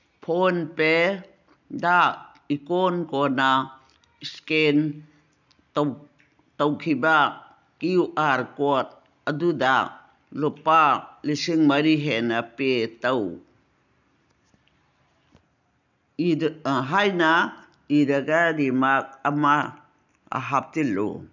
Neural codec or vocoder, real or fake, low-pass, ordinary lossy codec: none; real; 7.2 kHz; none